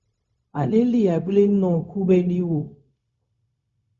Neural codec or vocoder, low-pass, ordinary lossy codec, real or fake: codec, 16 kHz, 0.4 kbps, LongCat-Audio-Codec; 7.2 kHz; Opus, 64 kbps; fake